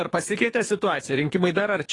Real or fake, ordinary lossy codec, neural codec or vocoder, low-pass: fake; AAC, 32 kbps; codec, 24 kHz, 3 kbps, HILCodec; 10.8 kHz